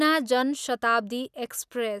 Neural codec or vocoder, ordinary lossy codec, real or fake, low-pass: none; none; real; 14.4 kHz